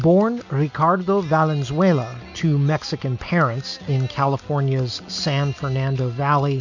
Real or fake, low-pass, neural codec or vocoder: real; 7.2 kHz; none